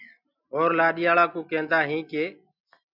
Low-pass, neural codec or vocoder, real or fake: 5.4 kHz; none; real